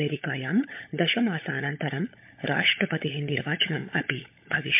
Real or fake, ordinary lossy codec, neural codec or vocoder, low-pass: fake; MP3, 32 kbps; codec, 16 kHz, 16 kbps, FunCodec, trained on LibriTTS, 50 frames a second; 3.6 kHz